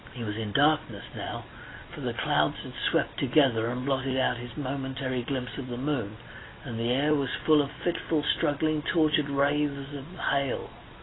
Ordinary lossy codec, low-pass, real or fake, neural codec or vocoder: AAC, 16 kbps; 7.2 kHz; real; none